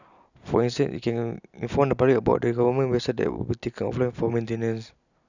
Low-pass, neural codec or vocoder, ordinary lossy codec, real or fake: 7.2 kHz; none; none; real